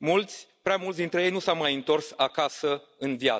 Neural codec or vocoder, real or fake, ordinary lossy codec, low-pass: none; real; none; none